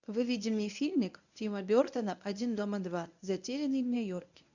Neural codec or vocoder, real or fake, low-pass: codec, 24 kHz, 0.9 kbps, WavTokenizer, medium speech release version 1; fake; 7.2 kHz